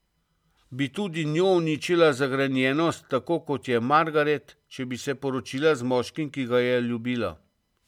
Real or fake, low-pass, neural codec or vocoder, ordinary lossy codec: real; 19.8 kHz; none; MP3, 96 kbps